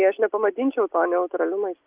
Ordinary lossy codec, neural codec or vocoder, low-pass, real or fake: Opus, 24 kbps; none; 3.6 kHz; real